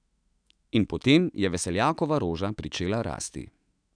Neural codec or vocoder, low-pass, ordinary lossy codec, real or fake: autoencoder, 48 kHz, 128 numbers a frame, DAC-VAE, trained on Japanese speech; 9.9 kHz; none; fake